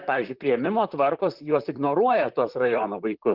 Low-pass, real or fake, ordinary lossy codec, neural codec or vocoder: 5.4 kHz; fake; Opus, 32 kbps; vocoder, 44.1 kHz, 128 mel bands, Pupu-Vocoder